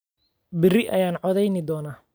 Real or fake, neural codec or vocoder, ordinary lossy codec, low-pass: real; none; none; none